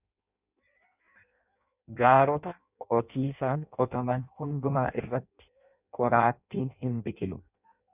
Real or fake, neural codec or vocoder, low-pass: fake; codec, 16 kHz in and 24 kHz out, 0.6 kbps, FireRedTTS-2 codec; 3.6 kHz